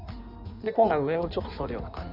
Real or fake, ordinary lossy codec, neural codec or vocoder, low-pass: fake; none; codec, 16 kHz in and 24 kHz out, 1.1 kbps, FireRedTTS-2 codec; 5.4 kHz